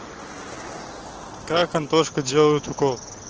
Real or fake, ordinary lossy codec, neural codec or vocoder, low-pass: real; Opus, 16 kbps; none; 7.2 kHz